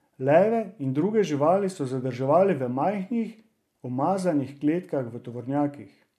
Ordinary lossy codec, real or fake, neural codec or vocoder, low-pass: MP3, 64 kbps; real; none; 14.4 kHz